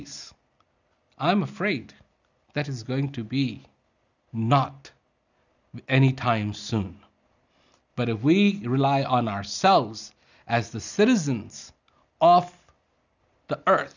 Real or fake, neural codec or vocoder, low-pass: real; none; 7.2 kHz